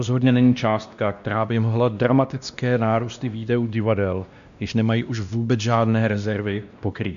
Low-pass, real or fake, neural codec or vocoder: 7.2 kHz; fake; codec, 16 kHz, 1 kbps, X-Codec, WavLM features, trained on Multilingual LibriSpeech